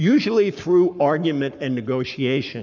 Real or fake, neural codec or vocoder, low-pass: fake; codec, 44.1 kHz, 7.8 kbps, Pupu-Codec; 7.2 kHz